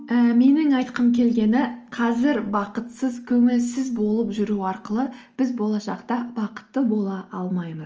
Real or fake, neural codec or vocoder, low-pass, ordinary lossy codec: real; none; 7.2 kHz; Opus, 24 kbps